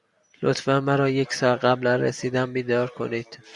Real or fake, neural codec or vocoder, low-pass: real; none; 10.8 kHz